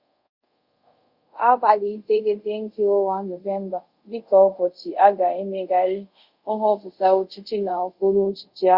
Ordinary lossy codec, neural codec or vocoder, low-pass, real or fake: Opus, 64 kbps; codec, 24 kHz, 0.5 kbps, DualCodec; 5.4 kHz; fake